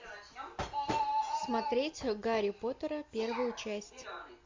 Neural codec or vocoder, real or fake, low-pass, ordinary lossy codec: none; real; 7.2 kHz; MP3, 48 kbps